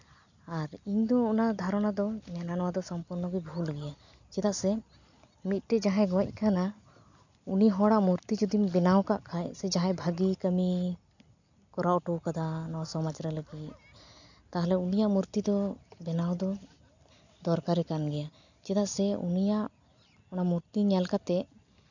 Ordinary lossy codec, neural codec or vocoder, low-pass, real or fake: none; none; 7.2 kHz; real